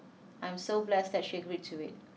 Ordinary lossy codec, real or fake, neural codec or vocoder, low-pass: none; real; none; none